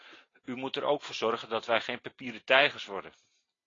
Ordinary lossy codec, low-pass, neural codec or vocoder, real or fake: AAC, 32 kbps; 7.2 kHz; none; real